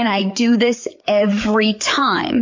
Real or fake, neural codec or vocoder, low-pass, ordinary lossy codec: fake; codec, 16 kHz, 4 kbps, FreqCodec, larger model; 7.2 kHz; MP3, 48 kbps